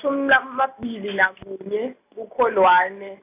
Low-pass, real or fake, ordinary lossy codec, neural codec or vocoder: 3.6 kHz; real; AAC, 32 kbps; none